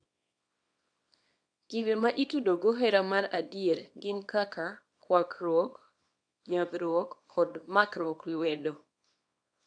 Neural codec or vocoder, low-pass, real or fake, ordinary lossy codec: codec, 24 kHz, 0.9 kbps, WavTokenizer, small release; 9.9 kHz; fake; AAC, 64 kbps